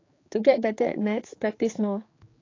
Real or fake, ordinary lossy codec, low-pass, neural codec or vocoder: fake; AAC, 32 kbps; 7.2 kHz; codec, 16 kHz, 4 kbps, X-Codec, HuBERT features, trained on general audio